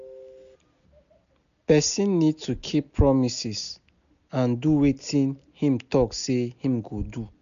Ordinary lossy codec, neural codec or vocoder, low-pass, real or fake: none; none; 7.2 kHz; real